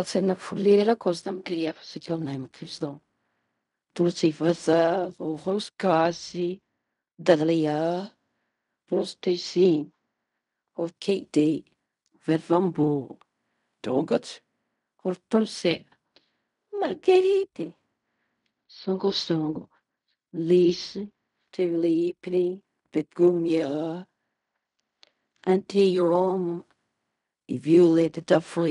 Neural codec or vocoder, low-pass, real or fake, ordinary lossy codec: codec, 16 kHz in and 24 kHz out, 0.4 kbps, LongCat-Audio-Codec, fine tuned four codebook decoder; 10.8 kHz; fake; none